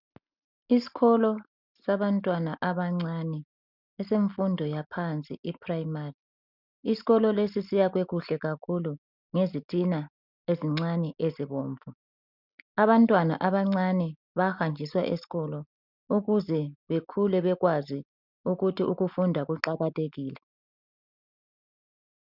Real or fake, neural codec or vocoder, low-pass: real; none; 5.4 kHz